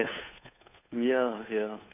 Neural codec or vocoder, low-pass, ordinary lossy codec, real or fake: codec, 16 kHz, 2 kbps, FunCodec, trained on Chinese and English, 25 frames a second; 3.6 kHz; none; fake